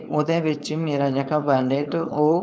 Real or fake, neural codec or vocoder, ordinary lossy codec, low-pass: fake; codec, 16 kHz, 4.8 kbps, FACodec; none; none